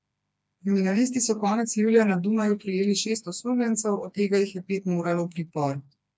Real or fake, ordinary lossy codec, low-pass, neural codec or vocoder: fake; none; none; codec, 16 kHz, 2 kbps, FreqCodec, smaller model